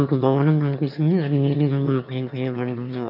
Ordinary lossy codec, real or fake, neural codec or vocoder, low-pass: none; fake; autoencoder, 22.05 kHz, a latent of 192 numbers a frame, VITS, trained on one speaker; 5.4 kHz